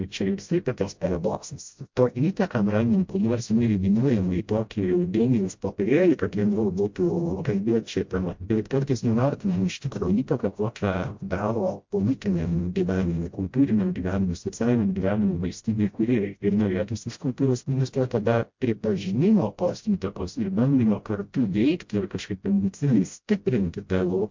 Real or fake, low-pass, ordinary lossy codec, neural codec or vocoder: fake; 7.2 kHz; MP3, 48 kbps; codec, 16 kHz, 0.5 kbps, FreqCodec, smaller model